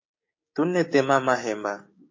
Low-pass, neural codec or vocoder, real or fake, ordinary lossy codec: 7.2 kHz; codec, 16 kHz, 6 kbps, DAC; fake; MP3, 32 kbps